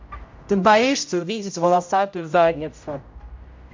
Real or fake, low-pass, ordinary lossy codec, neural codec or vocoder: fake; 7.2 kHz; MP3, 48 kbps; codec, 16 kHz, 0.5 kbps, X-Codec, HuBERT features, trained on general audio